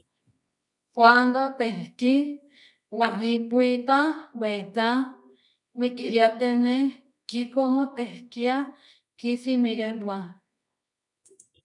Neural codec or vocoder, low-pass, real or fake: codec, 24 kHz, 0.9 kbps, WavTokenizer, medium music audio release; 10.8 kHz; fake